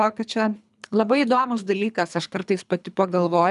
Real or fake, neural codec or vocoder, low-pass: fake; codec, 24 kHz, 3 kbps, HILCodec; 10.8 kHz